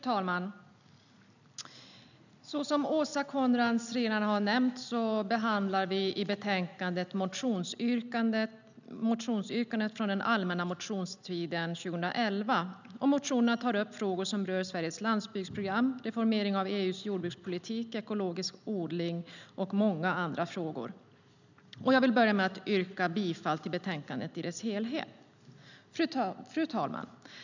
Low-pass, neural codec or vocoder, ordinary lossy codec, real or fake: 7.2 kHz; none; none; real